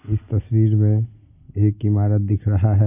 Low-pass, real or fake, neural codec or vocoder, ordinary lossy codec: 3.6 kHz; real; none; AAC, 32 kbps